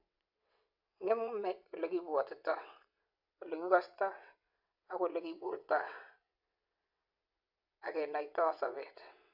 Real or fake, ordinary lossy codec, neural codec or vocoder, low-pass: fake; none; vocoder, 22.05 kHz, 80 mel bands, WaveNeXt; 5.4 kHz